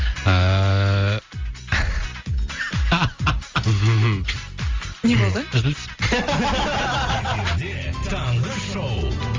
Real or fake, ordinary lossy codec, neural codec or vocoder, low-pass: real; Opus, 32 kbps; none; 7.2 kHz